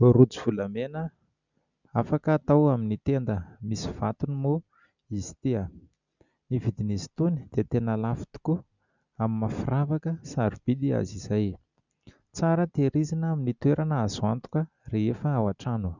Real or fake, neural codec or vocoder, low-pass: real; none; 7.2 kHz